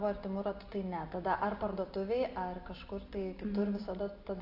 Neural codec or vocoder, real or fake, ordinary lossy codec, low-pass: none; real; AAC, 32 kbps; 5.4 kHz